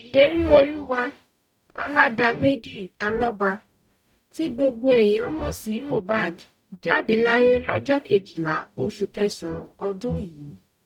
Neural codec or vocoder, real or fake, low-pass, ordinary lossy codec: codec, 44.1 kHz, 0.9 kbps, DAC; fake; 19.8 kHz; none